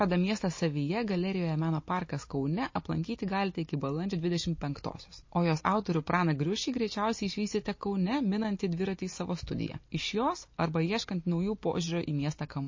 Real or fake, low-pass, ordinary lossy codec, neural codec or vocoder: real; 7.2 kHz; MP3, 32 kbps; none